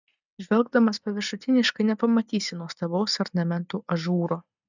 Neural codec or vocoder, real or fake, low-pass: none; real; 7.2 kHz